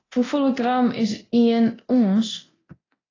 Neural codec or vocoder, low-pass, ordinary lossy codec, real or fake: codec, 24 kHz, 0.9 kbps, DualCodec; 7.2 kHz; AAC, 32 kbps; fake